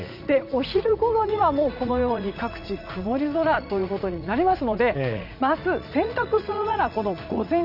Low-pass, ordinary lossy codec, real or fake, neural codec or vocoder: 5.4 kHz; none; fake; vocoder, 44.1 kHz, 80 mel bands, Vocos